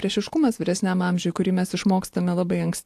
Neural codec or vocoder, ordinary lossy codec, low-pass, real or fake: none; AAC, 64 kbps; 14.4 kHz; real